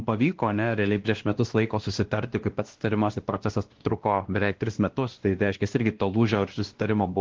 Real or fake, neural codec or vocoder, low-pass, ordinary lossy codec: fake; codec, 16 kHz, 1 kbps, X-Codec, WavLM features, trained on Multilingual LibriSpeech; 7.2 kHz; Opus, 16 kbps